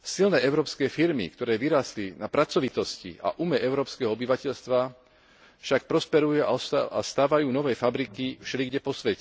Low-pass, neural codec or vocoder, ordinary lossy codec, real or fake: none; none; none; real